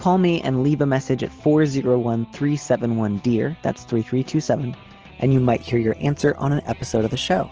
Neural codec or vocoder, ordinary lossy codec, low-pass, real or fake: none; Opus, 16 kbps; 7.2 kHz; real